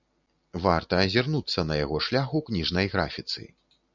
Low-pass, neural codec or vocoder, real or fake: 7.2 kHz; none; real